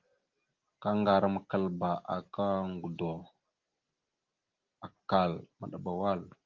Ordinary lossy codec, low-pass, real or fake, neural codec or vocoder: Opus, 32 kbps; 7.2 kHz; real; none